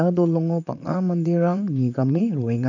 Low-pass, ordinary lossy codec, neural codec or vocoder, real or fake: 7.2 kHz; none; vocoder, 44.1 kHz, 128 mel bands, Pupu-Vocoder; fake